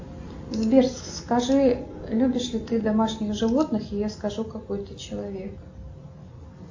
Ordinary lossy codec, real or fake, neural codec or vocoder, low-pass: AAC, 48 kbps; real; none; 7.2 kHz